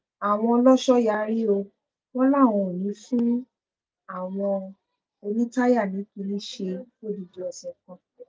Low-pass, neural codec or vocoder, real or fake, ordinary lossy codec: none; none; real; none